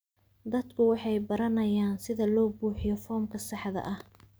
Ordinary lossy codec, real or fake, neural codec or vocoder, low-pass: none; real; none; none